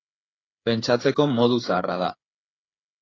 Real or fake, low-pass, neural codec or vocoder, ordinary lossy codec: fake; 7.2 kHz; codec, 16 kHz, 8 kbps, FreqCodec, smaller model; AAC, 32 kbps